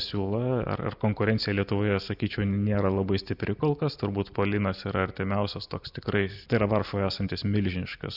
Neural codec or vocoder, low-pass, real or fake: none; 5.4 kHz; real